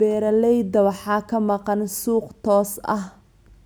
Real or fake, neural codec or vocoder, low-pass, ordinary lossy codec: real; none; none; none